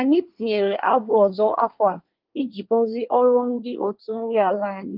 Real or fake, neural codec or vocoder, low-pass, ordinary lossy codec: fake; codec, 24 kHz, 1 kbps, SNAC; 5.4 kHz; Opus, 32 kbps